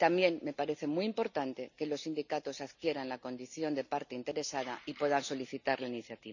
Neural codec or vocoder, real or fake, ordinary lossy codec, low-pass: none; real; none; 7.2 kHz